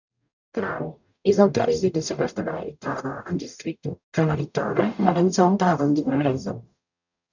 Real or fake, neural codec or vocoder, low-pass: fake; codec, 44.1 kHz, 0.9 kbps, DAC; 7.2 kHz